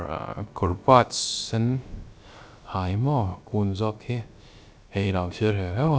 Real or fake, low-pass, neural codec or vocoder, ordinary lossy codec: fake; none; codec, 16 kHz, 0.3 kbps, FocalCodec; none